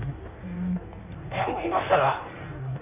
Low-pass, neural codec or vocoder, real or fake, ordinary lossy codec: 3.6 kHz; codec, 16 kHz in and 24 kHz out, 1.1 kbps, FireRedTTS-2 codec; fake; none